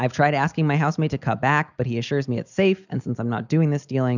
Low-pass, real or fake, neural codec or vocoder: 7.2 kHz; real; none